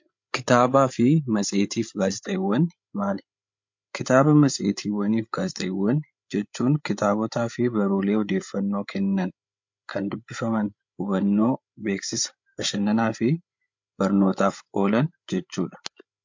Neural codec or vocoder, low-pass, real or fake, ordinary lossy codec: codec, 16 kHz, 8 kbps, FreqCodec, larger model; 7.2 kHz; fake; MP3, 48 kbps